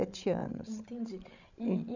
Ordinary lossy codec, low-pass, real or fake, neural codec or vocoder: none; 7.2 kHz; fake; codec, 16 kHz, 16 kbps, FreqCodec, larger model